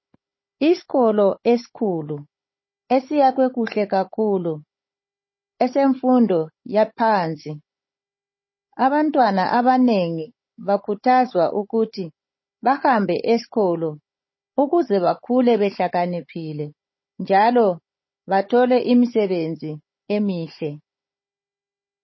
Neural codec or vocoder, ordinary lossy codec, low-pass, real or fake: codec, 16 kHz, 16 kbps, FunCodec, trained on Chinese and English, 50 frames a second; MP3, 24 kbps; 7.2 kHz; fake